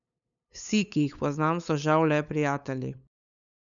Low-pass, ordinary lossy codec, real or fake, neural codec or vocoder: 7.2 kHz; none; fake; codec, 16 kHz, 8 kbps, FunCodec, trained on LibriTTS, 25 frames a second